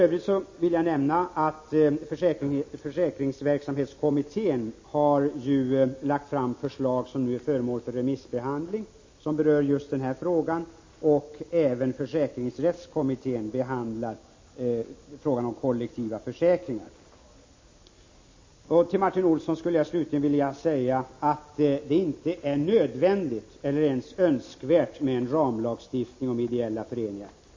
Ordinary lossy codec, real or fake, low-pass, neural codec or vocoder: MP3, 32 kbps; real; 7.2 kHz; none